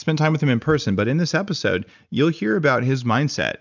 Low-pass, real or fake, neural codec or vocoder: 7.2 kHz; real; none